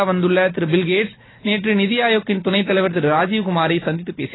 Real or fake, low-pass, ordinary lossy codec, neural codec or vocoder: real; 7.2 kHz; AAC, 16 kbps; none